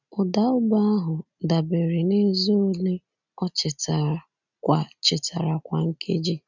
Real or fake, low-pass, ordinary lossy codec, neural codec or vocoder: real; 7.2 kHz; none; none